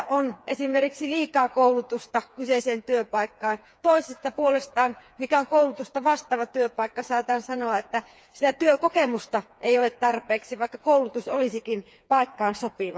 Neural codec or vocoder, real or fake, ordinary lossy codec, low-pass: codec, 16 kHz, 4 kbps, FreqCodec, smaller model; fake; none; none